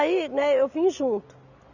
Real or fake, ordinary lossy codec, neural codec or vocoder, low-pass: real; none; none; 7.2 kHz